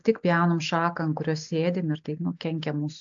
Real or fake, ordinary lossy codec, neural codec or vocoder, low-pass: real; AAC, 64 kbps; none; 7.2 kHz